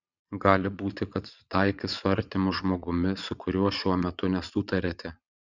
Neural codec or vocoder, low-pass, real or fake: vocoder, 22.05 kHz, 80 mel bands, Vocos; 7.2 kHz; fake